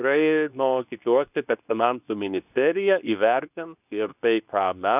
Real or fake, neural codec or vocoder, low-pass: fake; codec, 24 kHz, 0.9 kbps, WavTokenizer, medium speech release version 2; 3.6 kHz